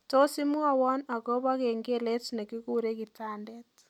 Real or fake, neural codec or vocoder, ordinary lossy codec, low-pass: real; none; none; 19.8 kHz